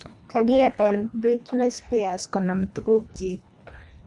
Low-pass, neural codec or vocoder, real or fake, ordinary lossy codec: 10.8 kHz; codec, 24 kHz, 1.5 kbps, HILCodec; fake; none